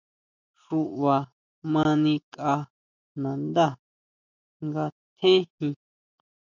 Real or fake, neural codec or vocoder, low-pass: real; none; 7.2 kHz